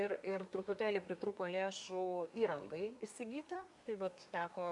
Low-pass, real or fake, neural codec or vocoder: 10.8 kHz; fake; codec, 24 kHz, 1 kbps, SNAC